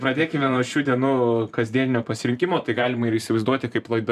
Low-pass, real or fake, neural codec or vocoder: 14.4 kHz; fake; vocoder, 44.1 kHz, 128 mel bands every 512 samples, BigVGAN v2